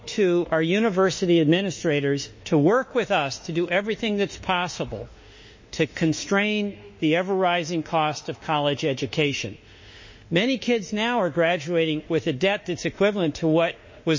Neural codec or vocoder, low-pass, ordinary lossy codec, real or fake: autoencoder, 48 kHz, 32 numbers a frame, DAC-VAE, trained on Japanese speech; 7.2 kHz; MP3, 32 kbps; fake